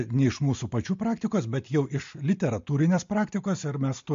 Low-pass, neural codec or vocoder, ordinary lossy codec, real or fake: 7.2 kHz; none; MP3, 48 kbps; real